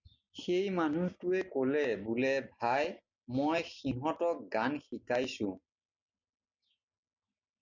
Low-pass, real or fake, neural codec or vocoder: 7.2 kHz; real; none